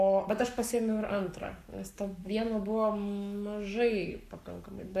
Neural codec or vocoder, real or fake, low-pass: codec, 44.1 kHz, 7.8 kbps, Pupu-Codec; fake; 14.4 kHz